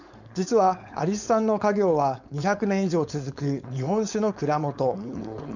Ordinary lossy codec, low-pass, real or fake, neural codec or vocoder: none; 7.2 kHz; fake; codec, 16 kHz, 4.8 kbps, FACodec